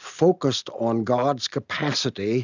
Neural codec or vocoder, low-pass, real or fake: none; 7.2 kHz; real